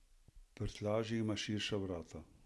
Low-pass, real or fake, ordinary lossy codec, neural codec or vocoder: none; real; none; none